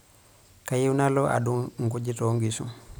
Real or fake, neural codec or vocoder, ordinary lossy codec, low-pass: real; none; none; none